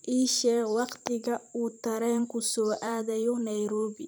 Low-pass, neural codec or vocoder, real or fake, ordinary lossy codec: none; vocoder, 44.1 kHz, 128 mel bands every 512 samples, BigVGAN v2; fake; none